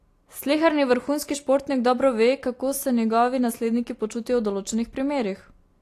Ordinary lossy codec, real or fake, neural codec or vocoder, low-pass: AAC, 48 kbps; real; none; 14.4 kHz